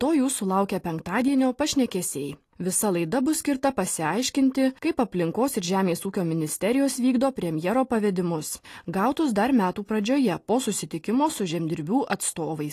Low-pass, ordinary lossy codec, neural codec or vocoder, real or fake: 14.4 kHz; AAC, 48 kbps; none; real